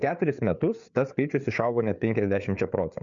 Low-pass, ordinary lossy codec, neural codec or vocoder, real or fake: 7.2 kHz; MP3, 64 kbps; codec, 16 kHz, 4 kbps, FreqCodec, larger model; fake